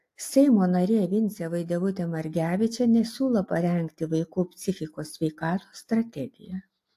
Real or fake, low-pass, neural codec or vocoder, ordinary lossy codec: real; 14.4 kHz; none; AAC, 64 kbps